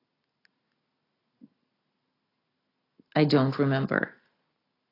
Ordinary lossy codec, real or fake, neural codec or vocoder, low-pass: AAC, 24 kbps; real; none; 5.4 kHz